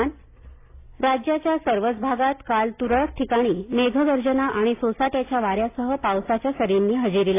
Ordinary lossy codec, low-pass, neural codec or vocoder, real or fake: AAC, 24 kbps; 3.6 kHz; none; real